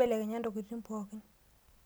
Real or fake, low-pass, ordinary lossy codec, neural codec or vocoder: real; none; none; none